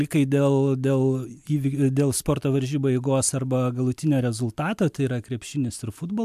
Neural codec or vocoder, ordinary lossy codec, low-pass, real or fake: none; MP3, 96 kbps; 14.4 kHz; real